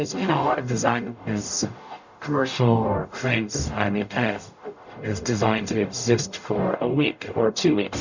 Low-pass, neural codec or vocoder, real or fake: 7.2 kHz; codec, 44.1 kHz, 0.9 kbps, DAC; fake